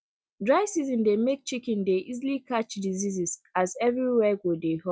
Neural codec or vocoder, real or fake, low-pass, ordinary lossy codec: none; real; none; none